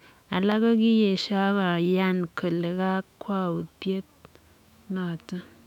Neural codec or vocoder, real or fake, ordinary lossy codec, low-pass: autoencoder, 48 kHz, 128 numbers a frame, DAC-VAE, trained on Japanese speech; fake; none; 19.8 kHz